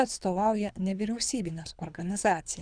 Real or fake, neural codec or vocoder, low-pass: fake; codec, 24 kHz, 3 kbps, HILCodec; 9.9 kHz